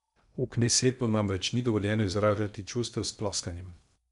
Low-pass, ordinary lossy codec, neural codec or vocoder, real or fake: 10.8 kHz; none; codec, 16 kHz in and 24 kHz out, 0.8 kbps, FocalCodec, streaming, 65536 codes; fake